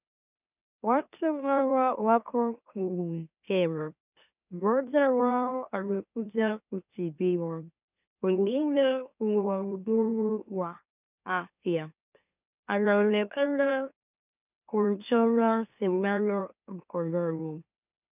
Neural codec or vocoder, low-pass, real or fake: autoencoder, 44.1 kHz, a latent of 192 numbers a frame, MeloTTS; 3.6 kHz; fake